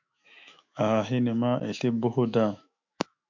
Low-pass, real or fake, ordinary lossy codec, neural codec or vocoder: 7.2 kHz; fake; MP3, 64 kbps; autoencoder, 48 kHz, 128 numbers a frame, DAC-VAE, trained on Japanese speech